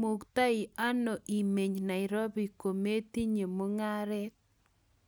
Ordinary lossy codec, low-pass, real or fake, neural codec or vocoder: none; none; real; none